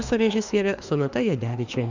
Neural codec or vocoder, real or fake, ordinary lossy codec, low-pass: autoencoder, 48 kHz, 32 numbers a frame, DAC-VAE, trained on Japanese speech; fake; Opus, 64 kbps; 7.2 kHz